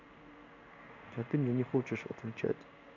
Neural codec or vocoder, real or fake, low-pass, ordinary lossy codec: codec, 16 kHz in and 24 kHz out, 1 kbps, XY-Tokenizer; fake; 7.2 kHz; none